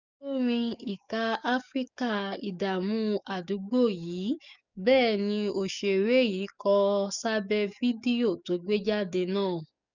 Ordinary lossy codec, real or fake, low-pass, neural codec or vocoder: none; fake; 7.2 kHz; codec, 44.1 kHz, 7.8 kbps, DAC